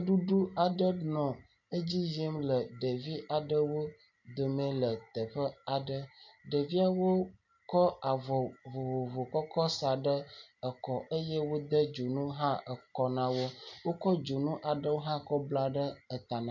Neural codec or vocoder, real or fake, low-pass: none; real; 7.2 kHz